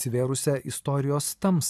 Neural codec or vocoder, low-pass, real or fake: vocoder, 44.1 kHz, 128 mel bands every 256 samples, BigVGAN v2; 14.4 kHz; fake